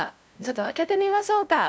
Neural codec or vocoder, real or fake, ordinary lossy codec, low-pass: codec, 16 kHz, 0.5 kbps, FunCodec, trained on LibriTTS, 25 frames a second; fake; none; none